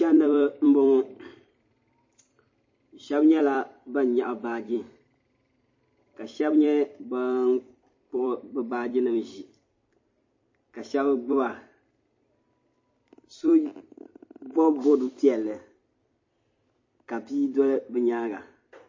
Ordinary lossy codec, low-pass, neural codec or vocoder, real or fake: MP3, 32 kbps; 7.2 kHz; vocoder, 44.1 kHz, 128 mel bands every 256 samples, BigVGAN v2; fake